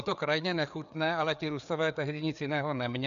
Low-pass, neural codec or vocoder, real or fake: 7.2 kHz; codec, 16 kHz, 4 kbps, FreqCodec, larger model; fake